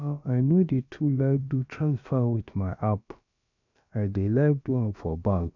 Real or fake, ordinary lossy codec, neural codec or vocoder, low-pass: fake; none; codec, 16 kHz, about 1 kbps, DyCAST, with the encoder's durations; 7.2 kHz